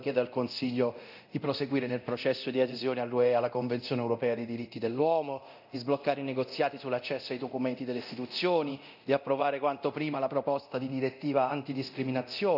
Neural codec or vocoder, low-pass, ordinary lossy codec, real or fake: codec, 24 kHz, 0.9 kbps, DualCodec; 5.4 kHz; none; fake